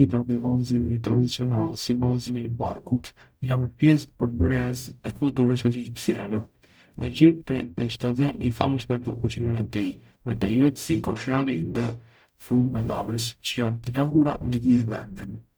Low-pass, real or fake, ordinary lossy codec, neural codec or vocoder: none; fake; none; codec, 44.1 kHz, 0.9 kbps, DAC